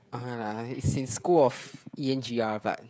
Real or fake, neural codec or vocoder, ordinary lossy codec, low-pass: fake; codec, 16 kHz, 16 kbps, FreqCodec, smaller model; none; none